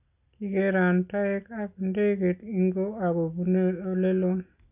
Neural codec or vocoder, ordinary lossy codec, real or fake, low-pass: none; none; real; 3.6 kHz